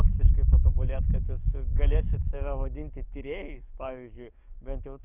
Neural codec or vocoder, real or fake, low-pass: codec, 44.1 kHz, 7.8 kbps, DAC; fake; 3.6 kHz